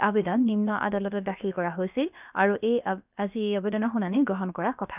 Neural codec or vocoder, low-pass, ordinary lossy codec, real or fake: codec, 16 kHz, about 1 kbps, DyCAST, with the encoder's durations; 3.6 kHz; none; fake